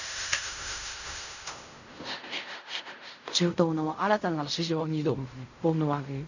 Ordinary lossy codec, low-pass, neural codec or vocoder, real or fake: none; 7.2 kHz; codec, 16 kHz in and 24 kHz out, 0.4 kbps, LongCat-Audio-Codec, fine tuned four codebook decoder; fake